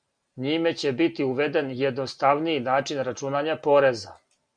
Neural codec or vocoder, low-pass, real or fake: none; 9.9 kHz; real